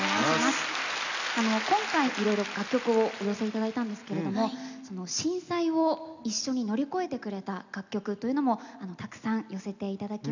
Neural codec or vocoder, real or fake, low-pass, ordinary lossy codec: none; real; 7.2 kHz; none